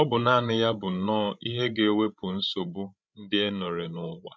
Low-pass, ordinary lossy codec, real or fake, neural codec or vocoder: none; none; fake; codec, 16 kHz, 16 kbps, FreqCodec, larger model